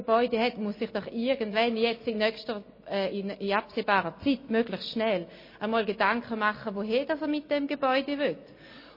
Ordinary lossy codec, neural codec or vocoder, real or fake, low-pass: MP3, 24 kbps; none; real; 5.4 kHz